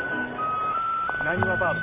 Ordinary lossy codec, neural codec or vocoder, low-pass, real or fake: none; none; 3.6 kHz; real